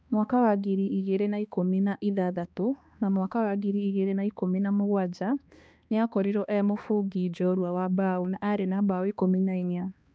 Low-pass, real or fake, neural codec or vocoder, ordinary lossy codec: none; fake; codec, 16 kHz, 2 kbps, X-Codec, HuBERT features, trained on balanced general audio; none